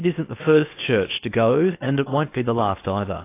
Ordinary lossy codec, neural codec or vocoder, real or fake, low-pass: AAC, 24 kbps; codec, 16 kHz in and 24 kHz out, 0.8 kbps, FocalCodec, streaming, 65536 codes; fake; 3.6 kHz